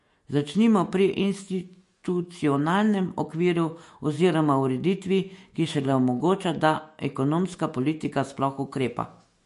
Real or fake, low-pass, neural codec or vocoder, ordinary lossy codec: fake; 14.4 kHz; autoencoder, 48 kHz, 128 numbers a frame, DAC-VAE, trained on Japanese speech; MP3, 48 kbps